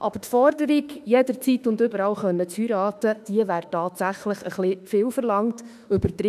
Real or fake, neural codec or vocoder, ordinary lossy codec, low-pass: fake; autoencoder, 48 kHz, 32 numbers a frame, DAC-VAE, trained on Japanese speech; none; 14.4 kHz